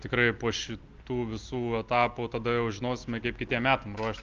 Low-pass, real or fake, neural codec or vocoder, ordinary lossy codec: 7.2 kHz; real; none; Opus, 24 kbps